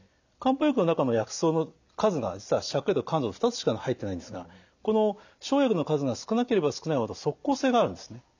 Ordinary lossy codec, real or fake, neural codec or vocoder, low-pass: none; real; none; 7.2 kHz